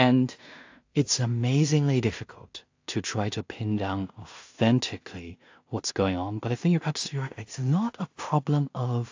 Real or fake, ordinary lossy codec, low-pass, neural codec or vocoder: fake; AAC, 48 kbps; 7.2 kHz; codec, 16 kHz in and 24 kHz out, 0.4 kbps, LongCat-Audio-Codec, two codebook decoder